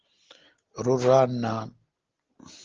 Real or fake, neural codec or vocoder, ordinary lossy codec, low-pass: real; none; Opus, 16 kbps; 7.2 kHz